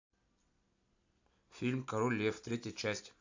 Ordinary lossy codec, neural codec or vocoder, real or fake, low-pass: AAC, 48 kbps; none; real; 7.2 kHz